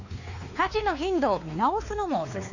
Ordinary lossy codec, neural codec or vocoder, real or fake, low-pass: none; codec, 16 kHz, 2 kbps, X-Codec, WavLM features, trained on Multilingual LibriSpeech; fake; 7.2 kHz